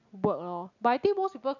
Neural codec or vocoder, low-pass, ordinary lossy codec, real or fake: none; 7.2 kHz; none; real